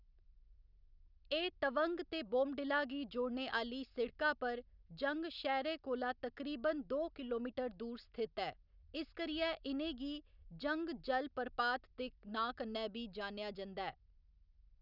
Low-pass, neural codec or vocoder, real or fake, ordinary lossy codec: 5.4 kHz; none; real; none